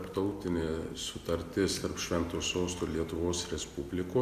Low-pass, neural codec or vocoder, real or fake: 14.4 kHz; none; real